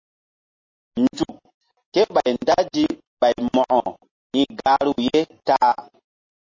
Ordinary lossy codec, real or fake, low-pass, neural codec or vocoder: MP3, 32 kbps; real; 7.2 kHz; none